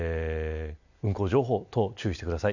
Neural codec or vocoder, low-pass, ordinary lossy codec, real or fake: none; 7.2 kHz; none; real